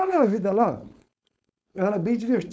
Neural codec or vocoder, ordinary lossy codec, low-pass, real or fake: codec, 16 kHz, 4.8 kbps, FACodec; none; none; fake